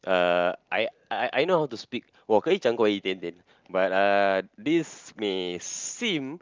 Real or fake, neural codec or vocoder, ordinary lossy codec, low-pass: real; none; Opus, 24 kbps; 7.2 kHz